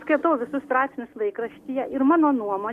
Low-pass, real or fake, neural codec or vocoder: 14.4 kHz; real; none